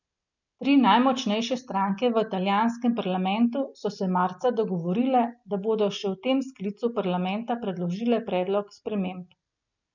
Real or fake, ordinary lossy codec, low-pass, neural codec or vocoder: real; none; 7.2 kHz; none